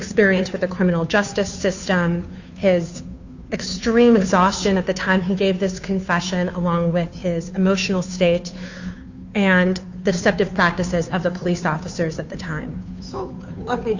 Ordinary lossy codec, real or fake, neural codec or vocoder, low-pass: Opus, 64 kbps; fake; codec, 16 kHz, 2 kbps, FunCodec, trained on Chinese and English, 25 frames a second; 7.2 kHz